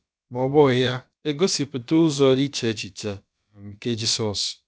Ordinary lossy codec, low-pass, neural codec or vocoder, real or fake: none; none; codec, 16 kHz, about 1 kbps, DyCAST, with the encoder's durations; fake